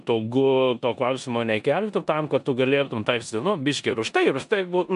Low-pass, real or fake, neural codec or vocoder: 10.8 kHz; fake; codec, 16 kHz in and 24 kHz out, 0.9 kbps, LongCat-Audio-Codec, four codebook decoder